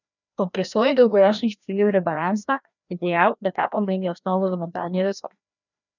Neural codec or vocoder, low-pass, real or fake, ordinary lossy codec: codec, 16 kHz, 1 kbps, FreqCodec, larger model; 7.2 kHz; fake; none